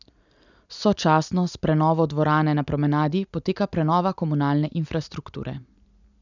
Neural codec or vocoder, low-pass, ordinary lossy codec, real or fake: none; 7.2 kHz; none; real